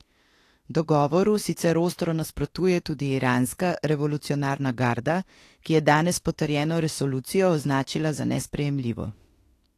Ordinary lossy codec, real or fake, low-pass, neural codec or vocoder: AAC, 48 kbps; fake; 14.4 kHz; autoencoder, 48 kHz, 32 numbers a frame, DAC-VAE, trained on Japanese speech